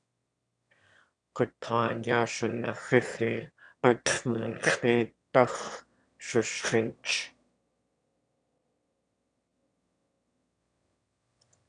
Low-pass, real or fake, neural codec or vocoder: 9.9 kHz; fake; autoencoder, 22.05 kHz, a latent of 192 numbers a frame, VITS, trained on one speaker